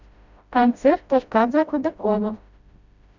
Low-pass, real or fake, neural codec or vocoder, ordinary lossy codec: 7.2 kHz; fake; codec, 16 kHz, 0.5 kbps, FreqCodec, smaller model; none